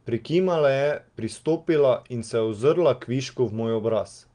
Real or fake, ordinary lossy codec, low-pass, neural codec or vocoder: real; Opus, 24 kbps; 9.9 kHz; none